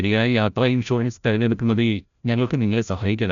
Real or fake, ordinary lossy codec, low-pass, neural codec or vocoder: fake; none; 7.2 kHz; codec, 16 kHz, 0.5 kbps, FreqCodec, larger model